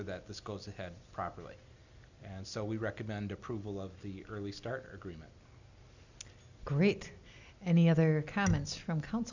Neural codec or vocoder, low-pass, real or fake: none; 7.2 kHz; real